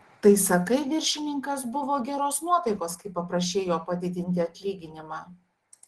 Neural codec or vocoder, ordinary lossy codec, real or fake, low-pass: none; Opus, 16 kbps; real; 10.8 kHz